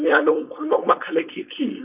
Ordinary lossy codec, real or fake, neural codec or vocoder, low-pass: none; fake; codec, 16 kHz, 4.8 kbps, FACodec; 3.6 kHz